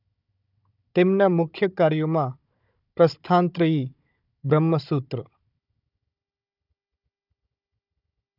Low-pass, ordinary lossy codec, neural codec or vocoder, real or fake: 5.4 kHz; none; codec, 16 kHz, 16 kbps, FunCodec, trained on Chinese and English, 50 frames a second; fake